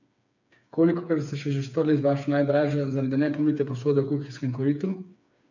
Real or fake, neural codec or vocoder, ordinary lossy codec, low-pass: fake; codec, 16 kHz, 4 kbps, FreqCodec, smaller model; none; 7.2 kHz